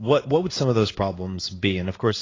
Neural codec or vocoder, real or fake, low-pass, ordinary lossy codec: codec, 16 kHz in and 24 kHz out, 1 kbps, XY-Tokenizer; fake; 7.2 kHz; AAC, 32 kbps